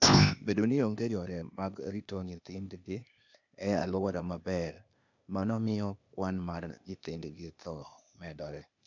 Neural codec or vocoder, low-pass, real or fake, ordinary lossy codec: codec, 16 kHz, 0.8 kbps, ZipCodec; 7.2 kHz; fake; none